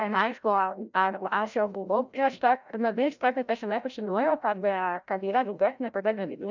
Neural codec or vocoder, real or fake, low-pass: codec, 16 kHz, 0.5 kbps, FreqCodec, larger model; fake; 7.2 kHz